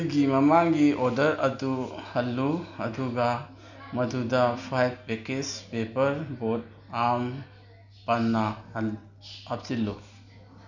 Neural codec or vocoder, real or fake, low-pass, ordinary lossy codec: none; real; 7.2 kHz; none